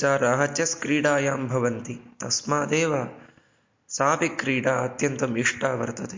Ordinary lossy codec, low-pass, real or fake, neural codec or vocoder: MP3, 48 kbps; 7.2 kHz; real; none